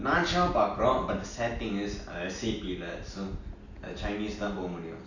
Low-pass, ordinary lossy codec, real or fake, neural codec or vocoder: 7.2 kHz; none; real; none